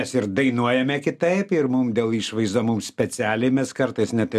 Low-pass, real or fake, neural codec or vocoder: 14.4 kHz; real; none